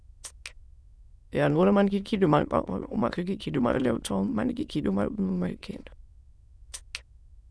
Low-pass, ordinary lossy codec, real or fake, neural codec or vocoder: none; none; fake; autoencoder, 22.05 kHz, a latent of 192 numbers a frame, VITS, trained on many speakers